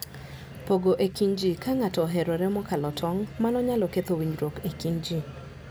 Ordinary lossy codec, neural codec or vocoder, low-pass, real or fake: none; none; none; real